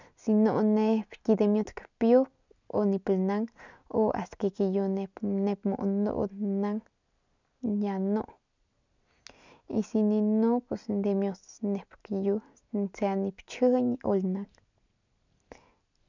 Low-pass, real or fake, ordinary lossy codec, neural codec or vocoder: 7.2 kHz; real; none; none